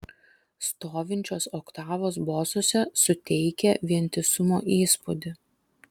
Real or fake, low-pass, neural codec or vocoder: real; 19.8 kHz; none